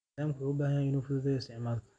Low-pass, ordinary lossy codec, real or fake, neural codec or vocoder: 9.9 kHz; AAC, 64 kbps; real; none